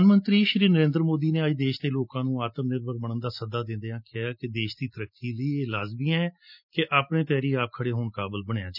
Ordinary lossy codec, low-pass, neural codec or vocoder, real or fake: none; 5.4 kHz; none; real